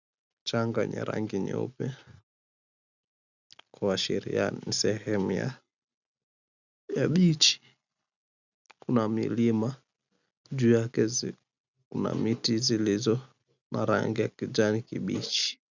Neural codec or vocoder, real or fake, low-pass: none; real; 7.2 kHz